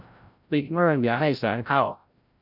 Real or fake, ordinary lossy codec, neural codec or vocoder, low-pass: fake; none; codec, 16 kHz, 0.5 kbps, FreqCodec, larger model; 5.4 kHz